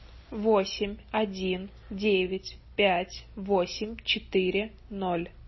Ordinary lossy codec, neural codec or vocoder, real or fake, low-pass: MP3, 24 kbps; none; real; 7.2 kHz